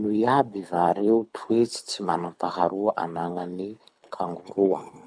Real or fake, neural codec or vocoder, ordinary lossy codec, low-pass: fake; codec, 24 kHz, 6 kbps, HILCodec; none; 9.9 kHz